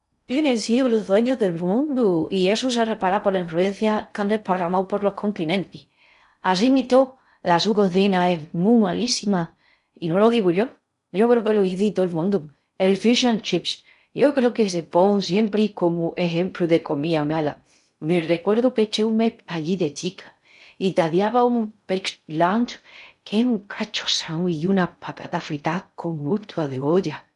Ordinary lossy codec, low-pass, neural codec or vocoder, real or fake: none; 10.8 kHz; codec, 16 kHz in and 24 kHz out, 0.6 kbps, FocalCodec, streaming, 2048 codes; fake